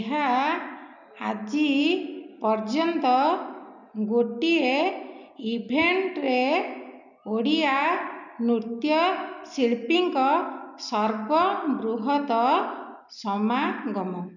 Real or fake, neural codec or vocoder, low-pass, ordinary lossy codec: real; none; 7.2 kHz; none